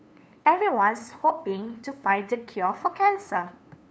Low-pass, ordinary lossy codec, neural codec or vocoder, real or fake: none; none; codec, 16 kHz, 2 kbps, FunCodec, trained on LibriTTS, 25 frames a second; fake